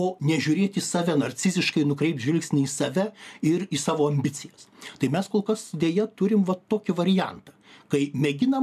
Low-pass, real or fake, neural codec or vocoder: 14.4 kHz; real; none